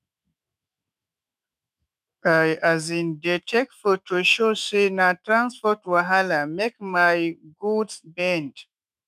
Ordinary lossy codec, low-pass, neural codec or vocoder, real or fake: none; 14.4 kHz; autoencoder, 48 kHz, 128 numbers a frame, DAC-VAE, trained on Japanese speech; fake